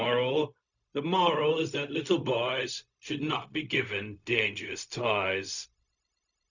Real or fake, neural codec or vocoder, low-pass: fake; codec, 16 kHz, 0.4 kbps, LongCat-Audio-Codec; 7.2 kHz